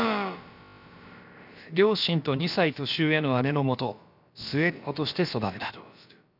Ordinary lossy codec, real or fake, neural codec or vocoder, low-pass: none; fake; codec, 16 kHz, about 1 kbps, DyCAST, with the encoder's durations; 5.4 kHz